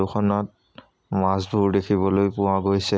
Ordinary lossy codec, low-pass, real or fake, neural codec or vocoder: none; none; real; none